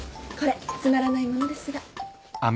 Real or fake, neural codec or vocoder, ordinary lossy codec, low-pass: real; none; none; none